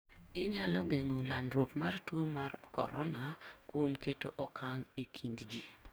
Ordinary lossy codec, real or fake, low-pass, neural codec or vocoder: none; fake; none; codec, 44.1 kHz, 2.6 kbps, DAC